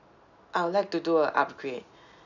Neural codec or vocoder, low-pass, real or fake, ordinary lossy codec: none; 7.2 kHz; real; none